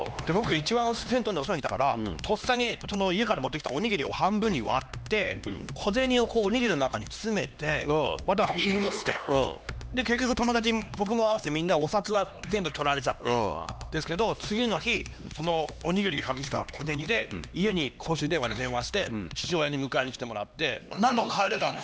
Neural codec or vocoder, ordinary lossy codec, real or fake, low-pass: codec, 16 kHz, 2 kbps, X-Codec, HuBERT features, trained on LibriSpeech; none; fake; none